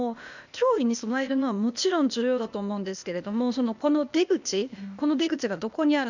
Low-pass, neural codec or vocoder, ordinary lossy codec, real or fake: 7.2 kHz; codec, 16 kHz, 0.8 kbps, ZipCodec; none; fake